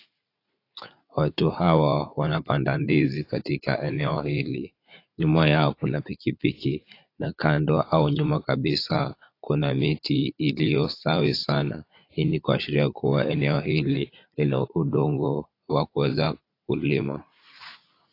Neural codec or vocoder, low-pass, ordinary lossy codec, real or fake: vocoder, 44.1 kHz, 80 mel bands, Vocos; 5.4 kHz; AAC, 32 kbps; fake